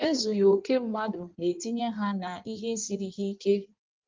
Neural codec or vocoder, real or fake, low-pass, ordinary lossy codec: codec, 16 kHz in and 24 kHz out, 1.1 kbps, FireRedTTS-2 codec; fake; 7.2 kHz; Opus, 24 kbps